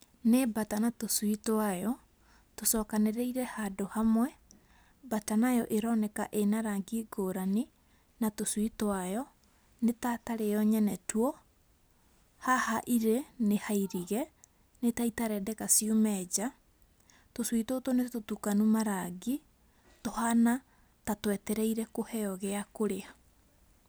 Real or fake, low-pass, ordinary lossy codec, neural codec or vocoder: real; none; none; none